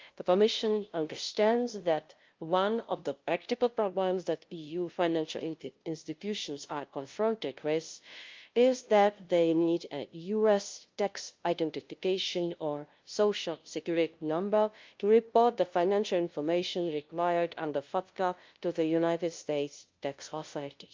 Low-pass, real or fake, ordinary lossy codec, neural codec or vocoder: 7.2 kHz; fake; Opus, 24 kbps; codec, 16 kHz, 0.5 kbps, FunCodec, trained on LibriTTS, 25 frames a second